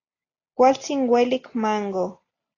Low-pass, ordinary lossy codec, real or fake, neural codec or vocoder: 7.2 kHz; AAC, 32 kbps; real; none